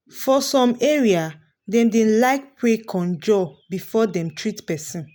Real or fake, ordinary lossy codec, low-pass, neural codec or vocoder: real; none; none; none